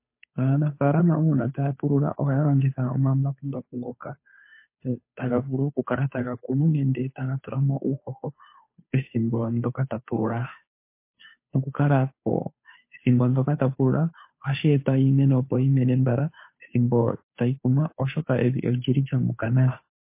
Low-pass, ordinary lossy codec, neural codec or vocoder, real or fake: 3.6 kHz; MP3, 24 kbps; codec, 16 kHz, 2 kbps, FunCodec, trained on Chinese and English, 25 frames a second; fake